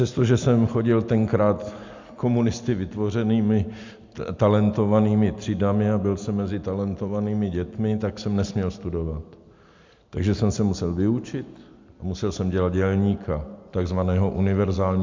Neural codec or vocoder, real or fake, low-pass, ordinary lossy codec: none; real; 7.2 kHz; MP3, 64 kbps